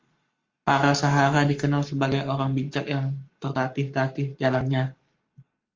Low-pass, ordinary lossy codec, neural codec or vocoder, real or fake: 7.2 kHz; Opus, 32 kbps; codec, 44.1 kHz, 7.8 kbps, Pupu-Codec; fake